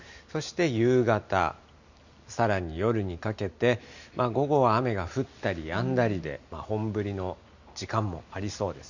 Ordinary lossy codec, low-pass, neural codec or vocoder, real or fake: none; 7.2 kHz; none; real